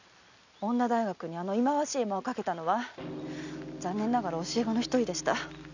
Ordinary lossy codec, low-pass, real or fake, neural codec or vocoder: none; 7.2 kHz; real; none